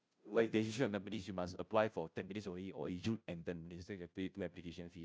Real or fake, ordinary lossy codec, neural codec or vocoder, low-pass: fake; none; codec, 16 kHz, 0.5 kbps, FunCodec, trained on Chinese and English, 25 frames a second; none